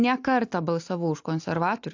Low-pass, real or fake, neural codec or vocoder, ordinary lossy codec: 7.2 kHz; real; none; AAC, 48 kbps